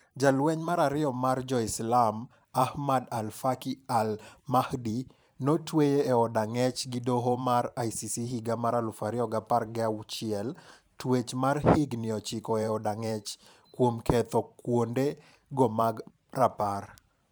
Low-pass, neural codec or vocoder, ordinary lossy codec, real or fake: none; vocoder, 44.1 kHz, 128 mel bands every 512 samples, BigVGAN v2; none; fake